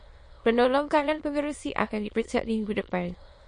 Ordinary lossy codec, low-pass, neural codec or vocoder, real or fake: MP3, 48 kbps; 9.9 kHz; autoencoder, 22.05 kHz, a latent of 192 numbers a frame, VITS, trained on many speakers; fake